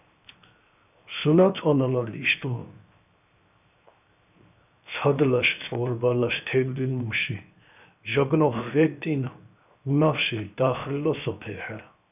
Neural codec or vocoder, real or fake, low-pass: codec, 16 kHz, 0.7 kbps, FocalCodec; fake; 3.6 kHz